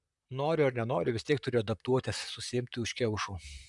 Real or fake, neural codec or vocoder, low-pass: fake; vocoder, 44.1 kHz, 128 mel bands, Pupu-Vocoder; 10.8 kHz